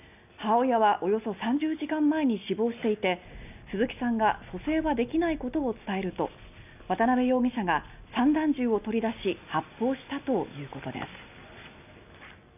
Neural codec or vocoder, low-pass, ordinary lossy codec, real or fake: none; 3.6 kHz; none; real